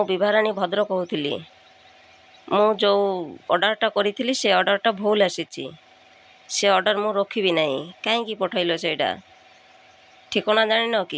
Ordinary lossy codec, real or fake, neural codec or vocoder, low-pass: none; real; none; none